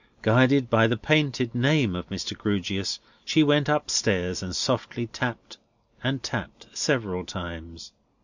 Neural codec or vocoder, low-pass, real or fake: none; 7.2 kHz; real